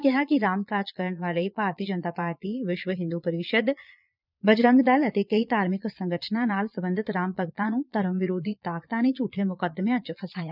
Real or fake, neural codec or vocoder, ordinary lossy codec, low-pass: fake; vocoder, 22.05 kHz, 80 mel bands, Vocos; none; 5.4 kHz